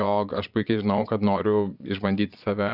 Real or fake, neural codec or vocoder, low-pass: fake; vocoder, 22.05 kHz, 80 mel bands, Vocos; 5.4 kHz